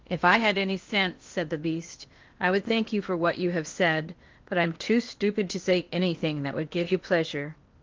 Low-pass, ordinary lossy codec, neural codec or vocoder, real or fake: 7.2 kHz; Opus, 32 kbps; codec, 16 kHz in and 24 kHz out, 0.8 kbps, FocalCodec, streaming, 65536 codes; fake